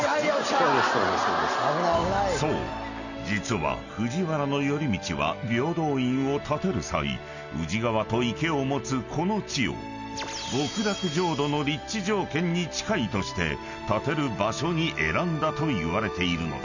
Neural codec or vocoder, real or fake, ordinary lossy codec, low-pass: none; real; none; 7.2 kHz